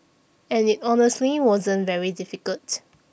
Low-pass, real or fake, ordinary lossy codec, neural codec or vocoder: none; real; none; none